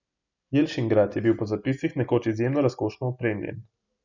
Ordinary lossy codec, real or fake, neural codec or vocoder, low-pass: none; real; none; 7.2 kHz